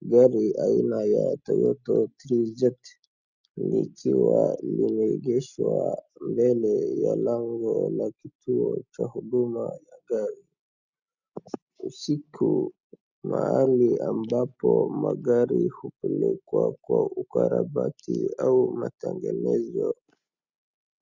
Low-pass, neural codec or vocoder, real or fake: 7.2 kHz; none; real